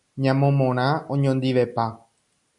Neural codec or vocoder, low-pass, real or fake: none; 10.8 kHz; real